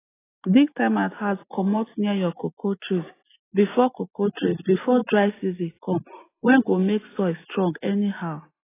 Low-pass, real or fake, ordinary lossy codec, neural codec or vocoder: 3.6 kHz; real; AAC, 16 kbps; none